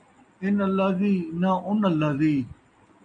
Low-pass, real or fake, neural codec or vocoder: 9.9 kHz; real; none